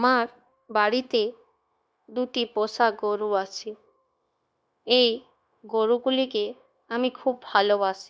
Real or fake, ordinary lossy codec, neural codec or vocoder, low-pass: fake; none; codec, 16 kHz, 0.9 kbps, LongCat-Audio-Codec; none